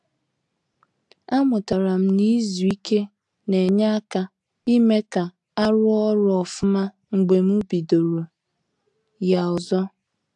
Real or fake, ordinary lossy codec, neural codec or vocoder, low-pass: real; AAC, 64 kbps; none; 10.8 kHz